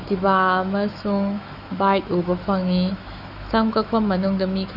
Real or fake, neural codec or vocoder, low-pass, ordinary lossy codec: fake; autoencoder, 48 kHz, 128 numbers a frame, DAC-VAE, trained on Japanese speech; 5.4 kHz; none